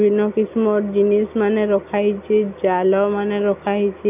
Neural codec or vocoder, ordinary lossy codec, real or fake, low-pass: none; none; real; 3.6 kHz